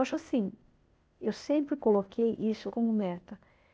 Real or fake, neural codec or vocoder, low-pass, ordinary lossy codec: fake; codec, 16 kHz, 0.8 kbps, ZipCodec; none; none